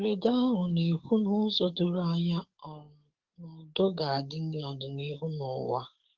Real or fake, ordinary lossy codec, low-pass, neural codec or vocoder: fake; Opus, 16 kbps; 7.2 kHz; codec, 44.1 kHz, 7.8 kbps, DAC